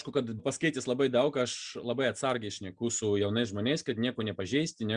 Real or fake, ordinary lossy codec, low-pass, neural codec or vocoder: real; Opus, 64 kbps; 9.9 kHz; none